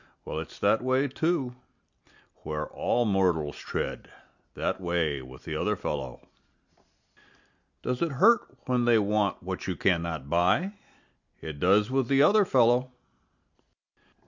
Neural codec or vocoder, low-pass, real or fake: none; 7.2 kHz; real